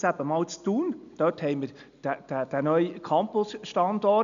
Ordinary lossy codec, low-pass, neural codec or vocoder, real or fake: none; 7.2 kHz; none; real